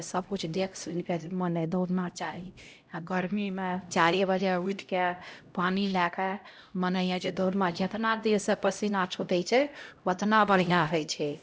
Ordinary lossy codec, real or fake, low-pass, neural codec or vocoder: none; fake; none; codec, 16 kHz, 0.5 kbps, X-Codec, HuBERT features, trained on LibriSpeech